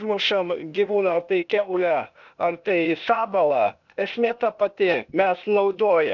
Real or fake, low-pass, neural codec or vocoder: fake; 7.2 kHz; codec, 16 kHz, 0.8 kbps, ZipCodec